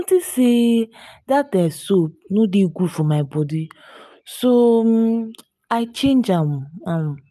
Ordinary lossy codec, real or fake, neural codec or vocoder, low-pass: none; real; none; 14.4 kHz